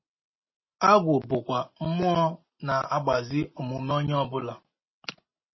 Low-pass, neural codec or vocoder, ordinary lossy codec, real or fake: 7.2 kHz; none; MP3, 24 kbps; real